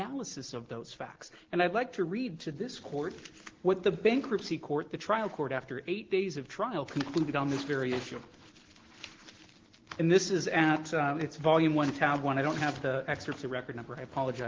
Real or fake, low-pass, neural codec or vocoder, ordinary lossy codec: real; 7.2 kHz; none; Opus, 16 kbps